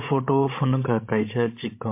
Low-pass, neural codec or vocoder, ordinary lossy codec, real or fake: 3.6 kHz; codec, 16 kHz, 16 kbps, FreqCodec, larger model; MP3, 24 kbps; fake